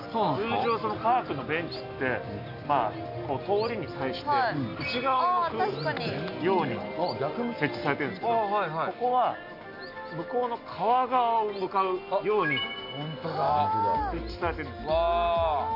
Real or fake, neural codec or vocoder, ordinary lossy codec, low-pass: real; none; none; 5.4 kHz